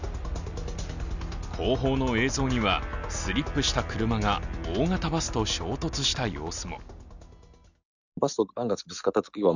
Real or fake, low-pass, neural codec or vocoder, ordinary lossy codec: real; 7.2 kHz; none; none